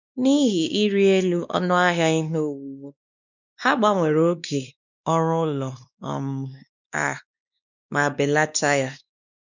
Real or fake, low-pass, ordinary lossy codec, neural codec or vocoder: fake; 7.2 kHz; none; codec, 16 kHz, 2 kbps, X-Codec, WavLM features, trained on Multilingual LibriSpeech